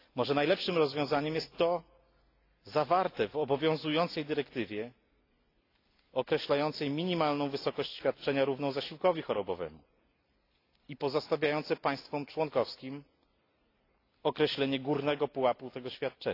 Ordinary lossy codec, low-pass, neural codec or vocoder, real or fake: AAC, 32 kbps; 5.4 kHz; none; real